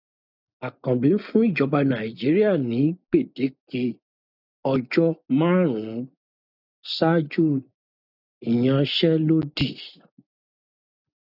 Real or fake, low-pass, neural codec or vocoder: real; 5.4 kHz; none